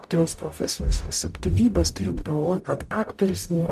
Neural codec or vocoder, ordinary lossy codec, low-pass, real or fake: codec, 44.1 kHz, 0.9 kbps, DAC; MP3, 96 kbps; 14.4 kHz; fake